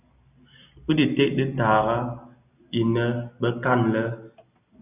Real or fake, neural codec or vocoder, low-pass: real; none; 3.6 kHz